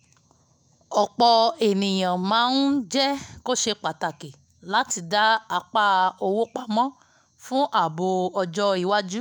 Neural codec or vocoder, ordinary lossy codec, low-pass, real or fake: autoencoder, 48 kHz, 128 numbers a frame, DAC-VAE, trained on Japanese speech; none; none; fake